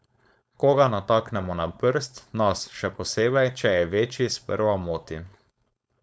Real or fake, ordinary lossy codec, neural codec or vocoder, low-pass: fake; none; codec, 16 kHz, 4.8 kbps, FACodec; none